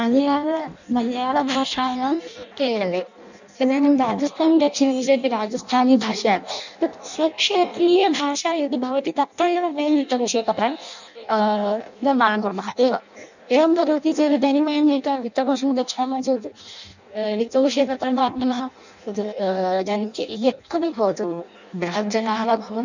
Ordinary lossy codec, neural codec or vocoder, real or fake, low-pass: none; codec, 16 kHz in and 24 kHz out, 0.6 kbps, FireRedTTS-2 codec; fake; 7.2 kHz